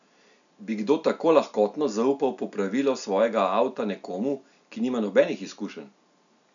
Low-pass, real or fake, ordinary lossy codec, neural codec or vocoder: 7.2 kHz; real; none; none